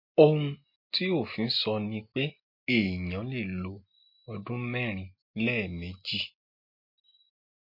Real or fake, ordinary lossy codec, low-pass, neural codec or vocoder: real; MP3, 32 kbps; 5.4 kHz; none